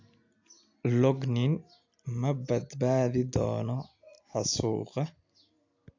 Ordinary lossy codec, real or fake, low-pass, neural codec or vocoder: AAC, 48 kbps; real; 7.2 kHz; none